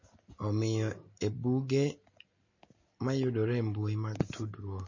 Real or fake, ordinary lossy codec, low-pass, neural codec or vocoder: real; MP3, 32 kbps; 7.2 kHz; none